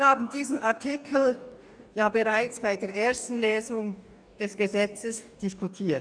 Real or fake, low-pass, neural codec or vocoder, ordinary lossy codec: fake; 9.9 kHz; codec, 44.1 kHz, 2.6 kbps, DAC; none